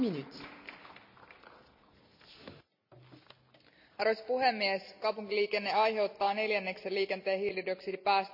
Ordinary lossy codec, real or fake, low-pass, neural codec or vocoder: none; real; 5.4 kHz; none